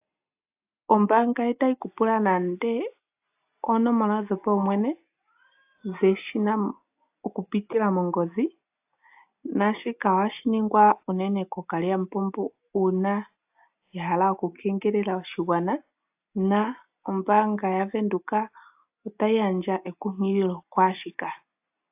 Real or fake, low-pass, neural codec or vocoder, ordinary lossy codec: real; 3.6 kHz; none; AAC, 32 kbps